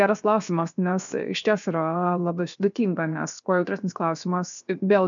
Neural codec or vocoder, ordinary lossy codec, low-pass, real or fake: codec, 16 kHz, 0.7 kbps, FocalCodec; MP3, 96 kbps; 7.2 kHz; fake